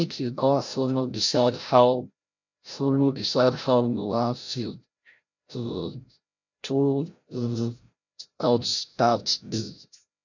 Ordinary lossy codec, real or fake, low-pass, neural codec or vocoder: none; fake; 7.2 kHz; codec, 16 kHz, 0.5 kbps, FreqCodec, larger model